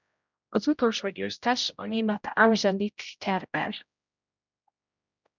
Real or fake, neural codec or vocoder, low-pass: fake; codec, 16 kHz, 0.5 kbps, X-Codec, HuBERT features, trained on general audio; 7.2 kHz